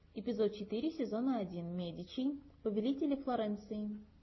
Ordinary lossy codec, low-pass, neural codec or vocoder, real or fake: MP3, 24 kbps; 7.2 kHz; none; real